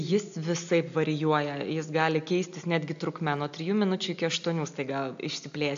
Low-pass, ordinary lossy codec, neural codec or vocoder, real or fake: 7.2 kHz; AAC, 64 kbps; none; real